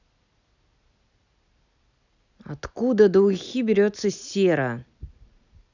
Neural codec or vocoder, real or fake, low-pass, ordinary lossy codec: none; real; 7.2 kHz; none